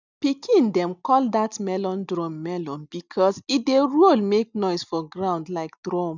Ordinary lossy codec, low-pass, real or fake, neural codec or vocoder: none; 7.2 kHz; real; none